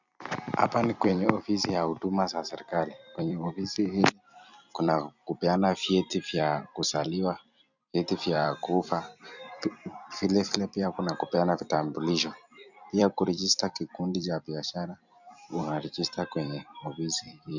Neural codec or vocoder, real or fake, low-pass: vocoder, 44.1 kHz, 128 mel bands every 256 samples, BigVGAN v2; fake; 7.2 kHz